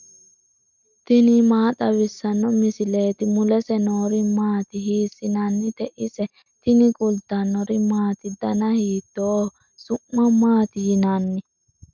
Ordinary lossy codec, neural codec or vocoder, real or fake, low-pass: MP3, 64 kbps; none; real; 7.2 kHz